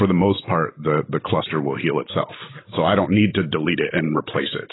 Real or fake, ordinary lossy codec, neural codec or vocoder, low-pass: real; AAC, 16 kbps; none; 7.2 kHz